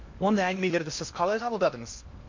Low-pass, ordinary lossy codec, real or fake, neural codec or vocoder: 7.2 kHz; MP3, 48 kbps; fake; codec, 16 kHz in and 24 kHz out, 0.8 kbps, FocalCodec, streaming, 65536 codes